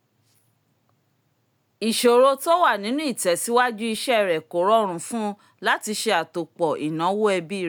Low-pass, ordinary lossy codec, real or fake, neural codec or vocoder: none; none; real; none